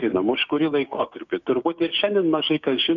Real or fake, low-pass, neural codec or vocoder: fake; 7.2 kHz; codec, 16 kHz, 6 kbps, DAC